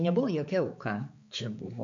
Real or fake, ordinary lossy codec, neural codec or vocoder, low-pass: fake; MP3, 48 kbps; codec, 16 kHz, 4 kbps, X-Codec, HuBERT features, trained on balanced general audio; 7.2 kHz